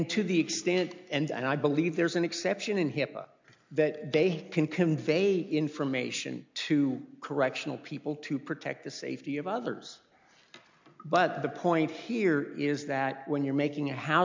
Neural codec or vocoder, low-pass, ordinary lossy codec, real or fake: none; 7.2 kHz; MP3, 64 kbps; real